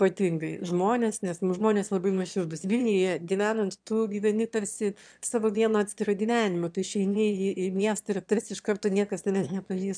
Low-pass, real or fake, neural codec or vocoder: 9.9 kHz; fake; autoencoder, 22.05 kHz, a latent of 192 numbers a frame, VITS, trained on one speaker